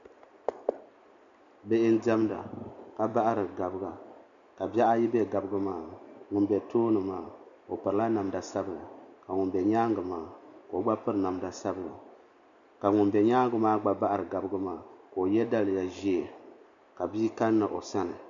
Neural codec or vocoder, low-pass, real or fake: none; 7.2 kHz; real